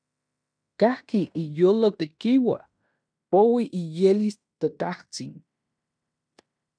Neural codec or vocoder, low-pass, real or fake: codec, 16 kHz in and 24 kHz out, 0.9 kbps, LongCat-Audio-Codec, fine tuned four codebook decoder; 9.9 kHz; fake